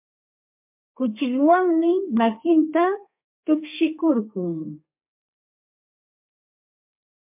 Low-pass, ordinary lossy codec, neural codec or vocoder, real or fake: 3.6 kHz; MP3, 32 kbps; codec, 32 kHz, 1.9 kbps, SNAC; fake